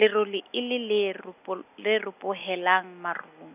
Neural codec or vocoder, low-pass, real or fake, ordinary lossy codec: none; 3.6 kHz; real; none